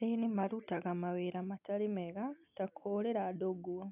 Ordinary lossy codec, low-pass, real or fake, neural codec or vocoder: none; 3.6 kHz; real; none